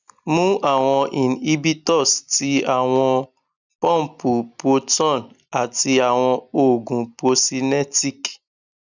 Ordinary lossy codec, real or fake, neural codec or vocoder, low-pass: none; real; none; 7.2 kHz